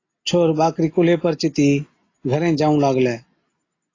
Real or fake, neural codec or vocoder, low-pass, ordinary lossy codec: real; none; 7.2 kHz; AAC, 32 kbps